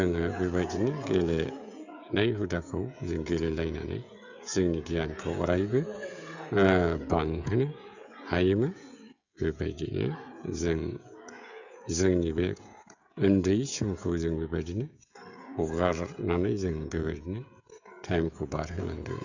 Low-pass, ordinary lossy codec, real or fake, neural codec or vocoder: 7.2 kHz; none; fake; codec, 16 kHz, 16 kbps, FreqCodec, smaller model